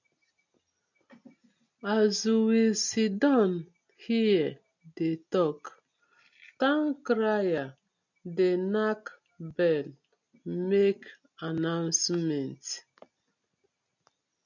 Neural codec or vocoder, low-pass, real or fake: none; 7.2 kHz; real